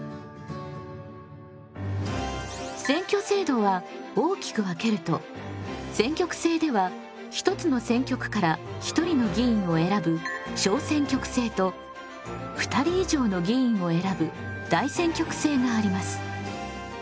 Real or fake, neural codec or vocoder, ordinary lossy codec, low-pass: real; none; none; none